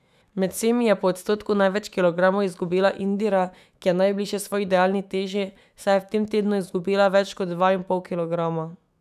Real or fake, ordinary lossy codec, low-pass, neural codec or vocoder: fake; none; 14.4 kHz; autoencoder, 48 kHz, 128 numbers a frame, DAC-VAE, trained on Japanese speech